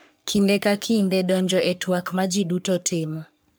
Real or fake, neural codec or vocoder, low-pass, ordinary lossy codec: fake; codec, 44.1 kHz, 3.4 kbps, Pupu-Codec; none; none